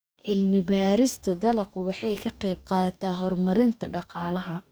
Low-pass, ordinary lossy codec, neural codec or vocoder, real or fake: none; none; codec, 44.1 kHz, 2.6 kbps, DAC; fake